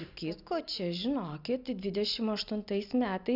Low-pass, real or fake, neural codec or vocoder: 5.4 kHz; real; none